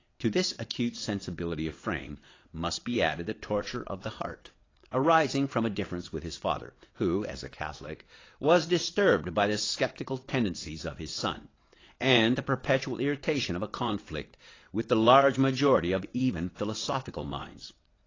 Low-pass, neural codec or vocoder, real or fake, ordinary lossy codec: 7.2 kHz; vocoder, 22.05 kHz, 80 mel bands, WaveNeXt; fake; AAC, 32 kbps